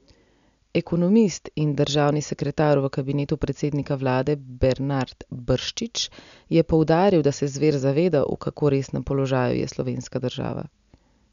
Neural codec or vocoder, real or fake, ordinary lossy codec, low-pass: none; real; none; 7.2 kHz